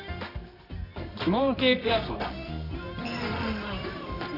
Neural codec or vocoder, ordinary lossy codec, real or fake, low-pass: codec, 24 kHz, 0.9 kbps, WavTokenizer, medium music audio release; none; fake; 5.4 kHz